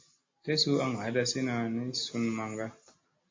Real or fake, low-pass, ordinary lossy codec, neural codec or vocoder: real; 7.2 kHz; MP3, 32 kbps; none